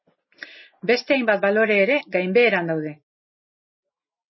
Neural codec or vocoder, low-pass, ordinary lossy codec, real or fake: none; 7.2 kHz; MP3, 24 kbps; real